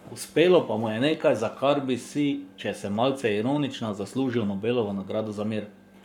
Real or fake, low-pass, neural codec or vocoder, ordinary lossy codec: fake; 19.8 kHz; codec, 44.1 kHz, 7.8 kbps, Pupu-Codec; none